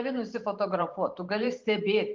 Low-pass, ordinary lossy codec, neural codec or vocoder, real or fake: 7.2 kHz; Opus, 32 kbps; vocoder, 44.1 kHz, 128 mel bands every 512 samples, BigVGAN v2; fake